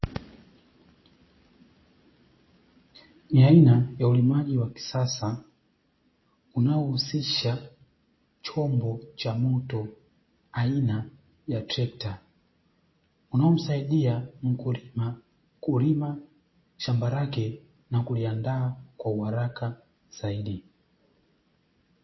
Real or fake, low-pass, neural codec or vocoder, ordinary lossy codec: real; 7.2 kHz; none; MP3, 24 kbps